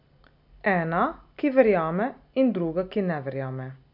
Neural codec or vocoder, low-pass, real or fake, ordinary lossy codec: none; 5.4 kHz; real; none